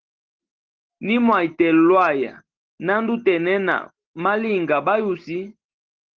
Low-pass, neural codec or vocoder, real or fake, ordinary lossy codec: 7.2 kHz; none; real; Opus, 16 kbps